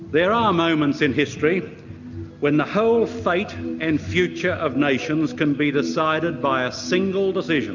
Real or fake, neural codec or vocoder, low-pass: real; none; 7.2 kHz